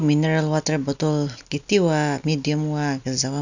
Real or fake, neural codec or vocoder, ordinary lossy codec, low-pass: real; none; none; 7.2 kHz